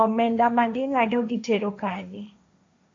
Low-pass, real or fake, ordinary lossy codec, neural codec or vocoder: 7.2 kHz; fake; AAC, 48 kbps; codec, 16 kHz, 1.1 kbps, Voila-Tokenizer